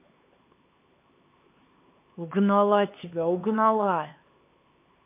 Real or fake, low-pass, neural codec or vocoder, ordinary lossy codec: fake; 3.6 kHz; codec, 16 kHz, 4 kbps, X-Codec, HuBERT features, trained on LibriSpeech; MP3, 24 kbps